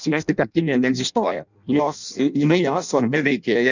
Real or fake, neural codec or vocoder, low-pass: fake; codec, 16 kHz in and 24 kHz out, 0.6 kbps, FireRedTTS-2 codec; 7.2 kHz